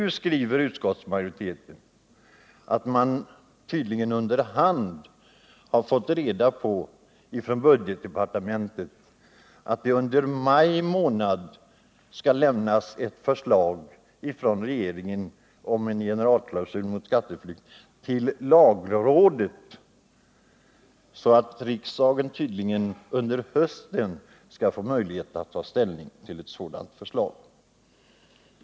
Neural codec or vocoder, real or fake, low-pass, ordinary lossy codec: none; real; none; none